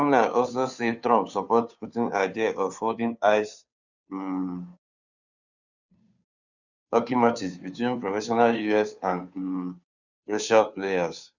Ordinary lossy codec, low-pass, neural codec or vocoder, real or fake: none; 7.2 kHz; codec, 16 kHz, 2 kbps, FunCodec, trained on Chinese and English, 25 frames a second; fake